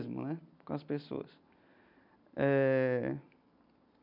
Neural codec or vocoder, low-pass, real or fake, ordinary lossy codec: none; 5.4 kHz; real; none